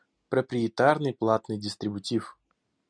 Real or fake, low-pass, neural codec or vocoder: real; 9.9 kHz; none